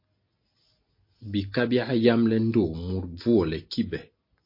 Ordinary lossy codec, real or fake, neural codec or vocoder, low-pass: MP3, 32 kbps; real; none; 5.4 kHz